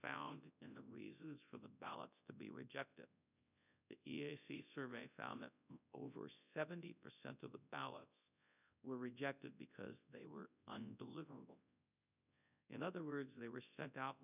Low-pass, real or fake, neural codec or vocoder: 3.6 kHz; fake; codec, 24 kHz, 0.9 kbps, WavTokenizer, large speech release